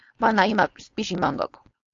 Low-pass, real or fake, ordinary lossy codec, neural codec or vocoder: 7.2 kHz; fake; AAC, 48 kbps; codec, 16 kHz, 4.8 kbps, FACodec